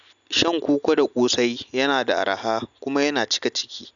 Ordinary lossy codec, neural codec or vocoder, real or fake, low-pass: none; none; real; 7.2 kHz